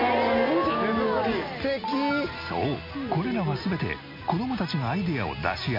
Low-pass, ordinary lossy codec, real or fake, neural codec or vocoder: 5.4 kHz; MP3, 32 kbps; real; none